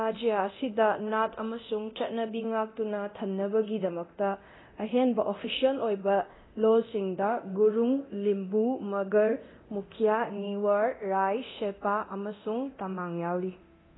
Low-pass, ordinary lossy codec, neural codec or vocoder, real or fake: 7.2 kHz; AAC, 16 kbps; codec, 24 kHz, 0.9 kbps, DualCodec; fake